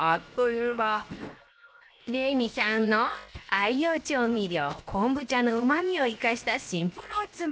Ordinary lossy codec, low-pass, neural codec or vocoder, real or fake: none; none; codec, 16 kHz, 0.7 kbps, FocalCodec; fake